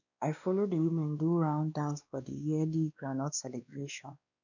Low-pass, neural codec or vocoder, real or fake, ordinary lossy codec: 7.2 kHz; codec, 16 kHz, 2 kbps, X-Codec, WavLM features, trained on Multilingual LibriSpeech; fake; none